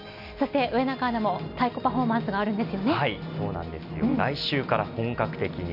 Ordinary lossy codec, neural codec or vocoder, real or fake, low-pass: none; none; real; 5.4 kHz